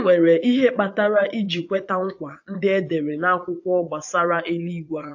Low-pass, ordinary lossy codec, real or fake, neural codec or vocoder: 7.2 kHz; AAC, 48 kbps; fake; vocoder, 22.05 kHz, 80 mel bands, Vocos